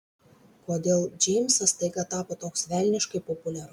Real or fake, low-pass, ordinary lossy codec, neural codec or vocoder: real; 19.8 kHz; MP3, 96 kbps; none